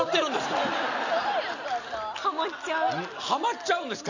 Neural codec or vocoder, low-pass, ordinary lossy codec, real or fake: none; 7.2 kHz; none; real